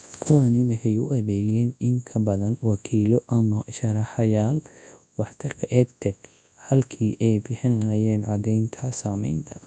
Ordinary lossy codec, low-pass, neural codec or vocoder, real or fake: none; 10.8 kHz; codec, 24 kHz, 0.9 kbps, WavTokenizer, large speech release; fake